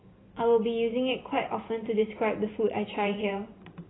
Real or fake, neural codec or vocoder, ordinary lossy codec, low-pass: fake; vocoder, 44.1 kHz, 128 mel bands every 512 samples, BigVGAN v2; AAC, 16 kbps; 7.2 kHz